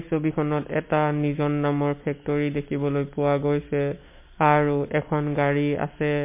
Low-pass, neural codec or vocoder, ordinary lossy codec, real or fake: 3.6 kHz; none; MP3, 24 kbps; real